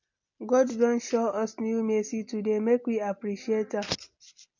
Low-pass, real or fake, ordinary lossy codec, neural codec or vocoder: 7.2 kHz; real; MP3, 48 kbps; none